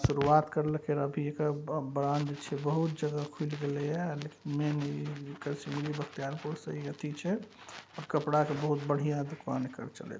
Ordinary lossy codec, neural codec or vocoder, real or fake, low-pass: none; none; real; none